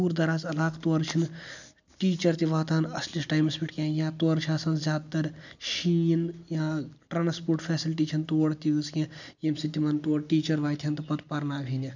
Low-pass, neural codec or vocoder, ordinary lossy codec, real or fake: 7.2 kHz; none; none; real